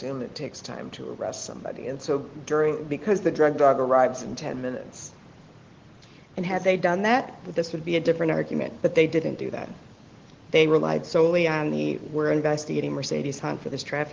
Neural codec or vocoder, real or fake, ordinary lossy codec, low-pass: none; real; Opus, 16 kbps; 7.2 kHz